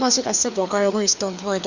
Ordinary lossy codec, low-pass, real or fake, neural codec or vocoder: none; 7.2 kHz; fake; codec, 16 kHz, 2 kbps, FreqCodec, larger model